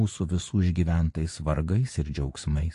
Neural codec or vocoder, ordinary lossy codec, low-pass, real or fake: vocoder, 24 kHz, 100 mel bands, Vocos; AAC, 48 kbps; 10.8 kHz; fake